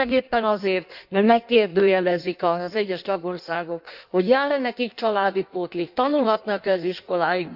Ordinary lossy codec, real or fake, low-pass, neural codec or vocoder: none; fake; 5.4 kHz; codec, 16 kHz in and 24 kHz out, 1.1 kbps, FireRedTTS-2 codec